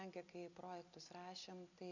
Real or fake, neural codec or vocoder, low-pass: real; none; 7.2 kHz